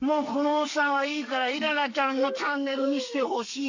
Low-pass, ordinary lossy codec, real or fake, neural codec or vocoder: 7.2 kHz; AAC, 48 kbps; fake; codec, 32 kHz, 1.9 kbps, SNAC